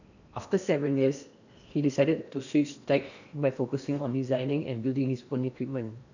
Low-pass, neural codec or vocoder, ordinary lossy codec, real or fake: 7.2 kHz; codec, 16 kHz in and 24 kHz out, 0.8 kbps, FocalCodec, streaming, 65536 codes; none; fake